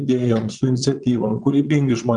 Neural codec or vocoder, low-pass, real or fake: vocoder, 22.05 kHz, 80 mel bands, Vocos; 9.9 kHz; fake